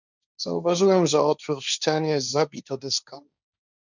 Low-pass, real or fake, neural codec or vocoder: 7.2 kHz; fake; codec, 16 kHz, 1.1 kbps, Voila-Tokenizer